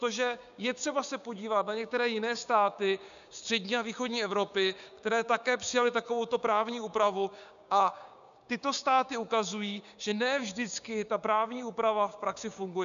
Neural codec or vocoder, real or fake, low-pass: codec, 16 kHz, 6 kbps, DAC; fake; 7.2 kHz